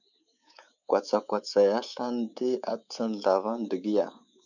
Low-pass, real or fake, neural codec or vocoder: 7.2 kHz; fake; codec, 24 kHz, 3.1 kbps, DualCodec